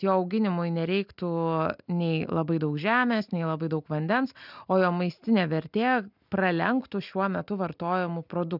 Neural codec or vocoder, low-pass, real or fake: none; 5.4 kHz; real